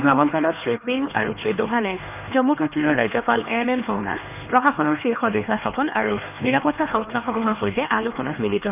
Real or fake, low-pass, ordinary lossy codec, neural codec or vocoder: fake; 3.6 kHz; none; codec, 16 kHz, 2 kbps, X-Codec, HuBERT features, trained on LibriSpeech